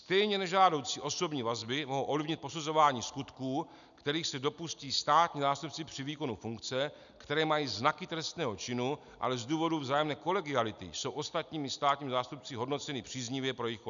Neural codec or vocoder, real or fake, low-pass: none; real; 7.2 kHz